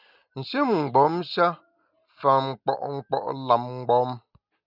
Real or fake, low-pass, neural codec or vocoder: real; 5.4 kHz; none